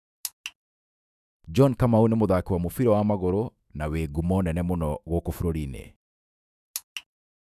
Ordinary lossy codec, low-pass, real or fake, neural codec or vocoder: none; 14.4 kHz; fake; autoencoder, 48 kHz, 128 numbers a frame, DAC-VAE, trained on Japanese speech